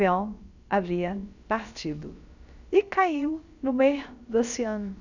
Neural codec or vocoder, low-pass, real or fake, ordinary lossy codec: codec, 16 kHz, about 1 kbps, DyCAST, with the encoder's durations; 7.2 kHz; fake; none